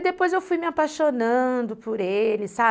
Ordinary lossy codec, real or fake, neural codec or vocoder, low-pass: none; real; none; none